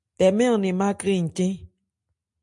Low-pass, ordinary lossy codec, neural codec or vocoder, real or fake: 10.8 kHz; MP3, 64 kbps; none; real